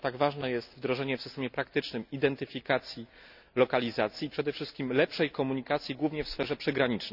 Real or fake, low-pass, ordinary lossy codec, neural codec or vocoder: real; 5.4 kHz; none; none